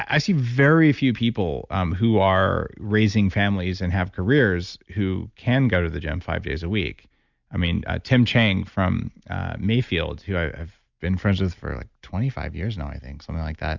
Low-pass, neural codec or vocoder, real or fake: 7.2 kHz; none; real